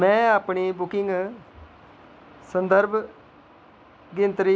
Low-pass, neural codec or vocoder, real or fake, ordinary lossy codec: none; none; real; none